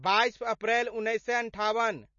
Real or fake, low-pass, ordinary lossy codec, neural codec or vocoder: real; 7.2 kHz; MP3, 32 kbps; none